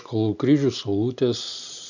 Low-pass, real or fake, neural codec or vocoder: 7.2 kHz; real; none